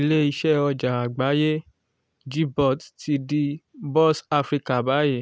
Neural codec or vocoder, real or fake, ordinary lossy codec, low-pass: none; real; none; none